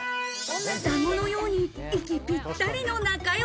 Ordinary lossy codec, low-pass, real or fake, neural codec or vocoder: none; none; real; none